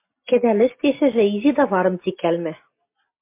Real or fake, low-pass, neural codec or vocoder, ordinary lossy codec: real; 3.6 kHz; none; MP3, 24 kbps